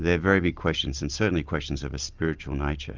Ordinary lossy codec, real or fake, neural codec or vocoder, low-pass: Opus, 24 kbps; real; none; 7.2 kHz